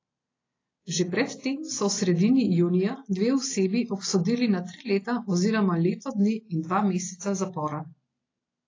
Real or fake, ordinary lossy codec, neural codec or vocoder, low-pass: real; AAC, 32 kbps; none; 7.2 kHz